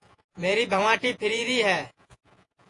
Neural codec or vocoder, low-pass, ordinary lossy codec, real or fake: vocoder, 48 kHz, 128 mel bands, Vocos; 10.8 kHz; AAC, 48 kbps; fake